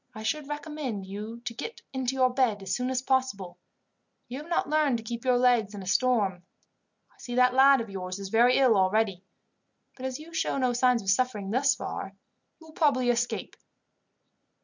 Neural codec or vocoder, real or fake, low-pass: none; real; 7.2 kHz